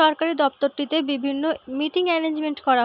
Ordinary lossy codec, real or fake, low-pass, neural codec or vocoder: none; real; 5.4 kHz; none